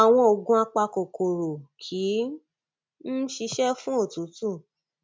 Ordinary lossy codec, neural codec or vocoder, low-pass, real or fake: none; none; none; real